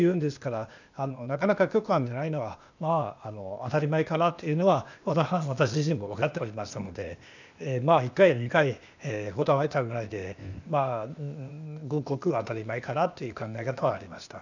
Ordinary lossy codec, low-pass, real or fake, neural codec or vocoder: none; 7.2 kHz; fake; codec, 16 kHz, 0.8 kbps, ZipCodec